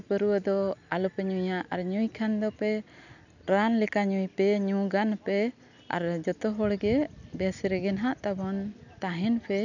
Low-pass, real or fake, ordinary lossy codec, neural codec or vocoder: 7.2 kHz; real; none; none